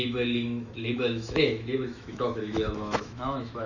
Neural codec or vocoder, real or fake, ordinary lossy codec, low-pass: autoencoder, 48 kHz, 128 numbers a frame, DAC-VAE, trained on Japanese speech; fake; none; 7.2 kHz